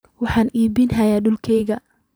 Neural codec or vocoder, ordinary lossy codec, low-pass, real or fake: vocoder, 44.1 kHz, 128 mel bands every 512 samples, BigVGAN v2; none; none; fake